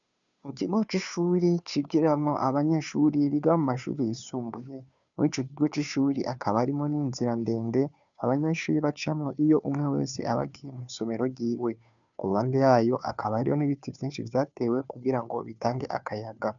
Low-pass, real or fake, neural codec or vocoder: 7.2 kHz; fake; codec, 16 kHz, 2 kbps, FunCodec, trained on Chinese and English, 25 frames a second